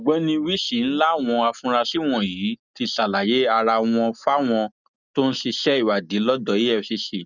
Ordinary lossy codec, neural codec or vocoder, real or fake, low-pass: none; none; real; 7.2 kHz